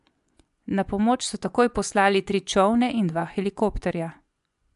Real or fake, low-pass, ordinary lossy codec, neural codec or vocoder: fake; 10.8 kHz; AAC, 96 kbps; vocoder, 24 kHz, 100 mel bands, Vocos